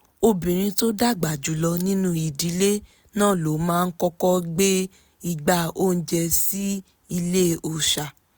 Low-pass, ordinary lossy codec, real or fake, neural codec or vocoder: none; none; real; none